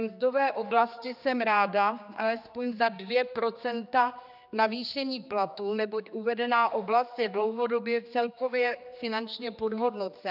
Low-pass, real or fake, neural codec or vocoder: 5.4 kHz; fake; codec, 16 kHz, 2 kbps, X-Codec, HuBERT features, trained on general audio